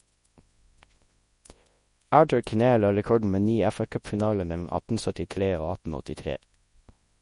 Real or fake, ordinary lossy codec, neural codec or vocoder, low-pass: fake; MP3, 48 kbps; codec, 24 kHz, 0.9 kbps, WavTokenizer, large speech release; 10.8 kHz